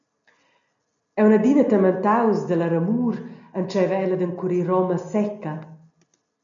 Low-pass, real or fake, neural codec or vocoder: 7.2 kHz; real; none